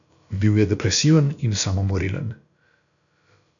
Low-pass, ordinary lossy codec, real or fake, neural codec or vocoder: 7.2 kHz; MP3, 96 kbps; fake; codec, 16 kHz, about 1 kbps, DyCAST, with the encoder's durations